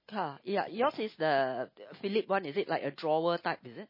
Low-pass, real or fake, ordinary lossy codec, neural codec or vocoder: 5.4 kHz; real; MP3, 24 kbps; none